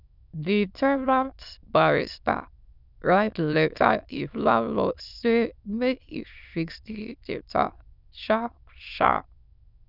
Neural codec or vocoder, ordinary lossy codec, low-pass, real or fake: autoencoder, 22.05 kHz, a latent of 192 numbers a frame, VITS, trained on many speakers; none; 5.4 kHz; fake